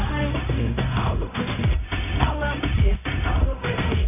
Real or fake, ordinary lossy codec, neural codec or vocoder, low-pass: fake; none; codec, 16 kHz, 0.4 kbps, LongCat-Audio-Codec; 3.6 kHz